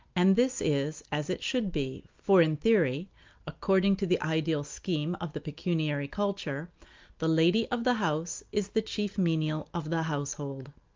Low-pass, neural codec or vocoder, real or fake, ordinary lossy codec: 7.2 kHz; none; real; Opus, 24 kbps